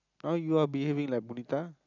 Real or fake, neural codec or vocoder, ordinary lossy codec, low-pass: real; none; none; 7.2 kHz